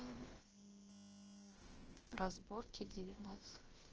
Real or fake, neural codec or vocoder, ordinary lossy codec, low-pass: fake; codec, 16 kHz, about 1 kbps, DyCAST, with the encoder's durations; Opus, 16 kbps; 7.2 kHz